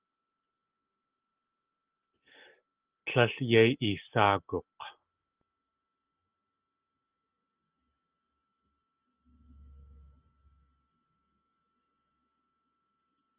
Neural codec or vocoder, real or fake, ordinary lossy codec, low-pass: none; real; Opus, 24 kbps; 3.6 kHz